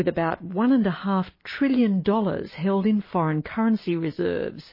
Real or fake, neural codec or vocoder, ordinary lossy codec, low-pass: real; none; MP3, 24 kbps; 5.4 kHz